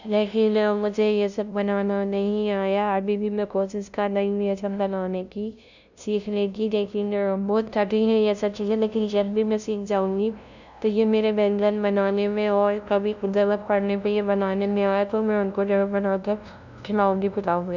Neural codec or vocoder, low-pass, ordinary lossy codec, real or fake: codec, 16 kHz, 0.5 kbps, FunCodec, trained on LibriTTS, 25 frames a second; 7.2 kHz; none; fake